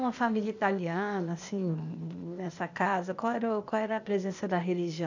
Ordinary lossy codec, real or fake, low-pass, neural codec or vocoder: none; fake; 7.2 kHz; codec, 16 kHz, 0.8 kbps, ZipCodec